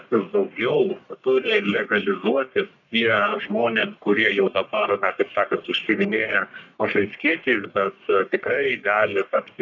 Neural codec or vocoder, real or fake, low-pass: codec, 44.1 kHz, 1.7 kbps, Pupu-Codec; fake; 7.2 kHz